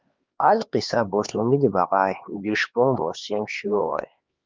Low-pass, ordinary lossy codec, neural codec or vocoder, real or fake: 7.2 kHz; Opus, 24 kbps; codec, 16 kHz, 2 kbps, X-Codec, HuBERT features, trained on LibriSpeech; fake